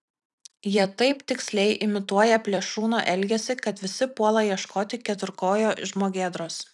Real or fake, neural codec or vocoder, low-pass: fake; vocoder, 44.1 kHz, 128 mel bands every 256 samples, BigVGAN v2; 10.8 kHz